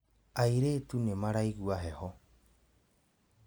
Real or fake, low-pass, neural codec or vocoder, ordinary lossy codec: real; none; none; none